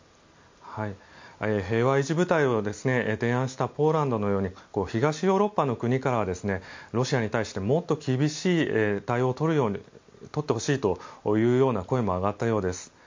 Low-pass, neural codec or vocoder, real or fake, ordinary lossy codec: 7.2 kHz; none; real; MP3, 64 kbps